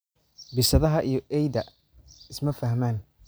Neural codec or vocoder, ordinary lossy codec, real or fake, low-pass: none; none; real; none